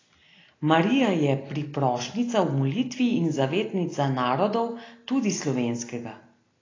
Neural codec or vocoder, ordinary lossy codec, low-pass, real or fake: none; AAC, 32 kbps; 7.2 kHz; real